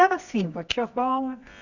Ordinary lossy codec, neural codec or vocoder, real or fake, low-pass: none; codec, 24 kHz, 0.9 kbps, WavTokenizer, medium music audio release; fake; 7.2 kHz